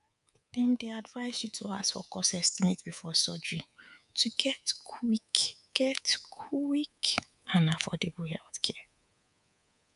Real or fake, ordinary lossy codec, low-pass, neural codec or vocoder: fake; none; 10.8 kHz; codec, 24 kHz, 3.1 kbps, DualCodec